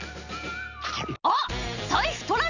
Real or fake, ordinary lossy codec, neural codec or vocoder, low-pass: real; none; none; 7.2 kHz